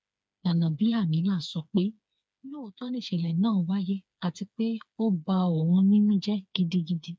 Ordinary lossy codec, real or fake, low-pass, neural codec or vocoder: none; fake; none; codec, 16 kHz, 4 kbps, FreqCodec, smaller model